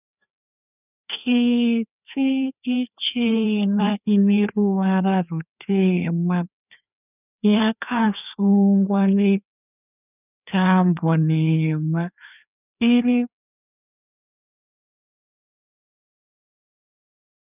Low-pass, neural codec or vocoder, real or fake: 3.6 kHz; codec, 16 kHz, 2 kbps, FreqCodec, larger model; fake